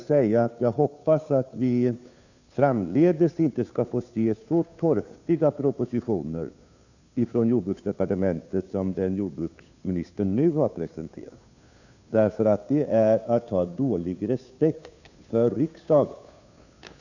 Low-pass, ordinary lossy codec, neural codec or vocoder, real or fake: 7.2 kHz; none; codec, 16 kHz, 2 kbps, FunCodec, trained on Chinese and English, 25 frames a second; fake